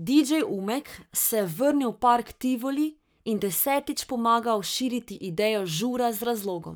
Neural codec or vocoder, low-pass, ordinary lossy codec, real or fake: codec, 44.1 kHz, 7.8 kbps, Pupu-Codec; none; none; fake